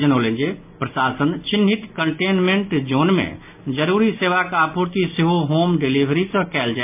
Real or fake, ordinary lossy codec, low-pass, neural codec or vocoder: real; MP3, 32 kbps; 3.6 kHz; none